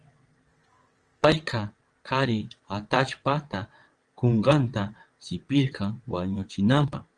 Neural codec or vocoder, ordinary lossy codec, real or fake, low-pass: vocoder, 22.05 kHz, 80 mel bands, WaveNeXt; Opus, 24 kbps; fake; 9.9 kHz